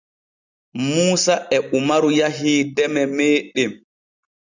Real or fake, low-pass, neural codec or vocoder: real; 7.2 kHz; none